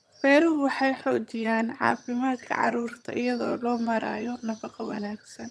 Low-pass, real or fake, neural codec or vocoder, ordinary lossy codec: none; fake; vocoder, 22.05 kHz, 80 mel bands, HiFi-GAN; none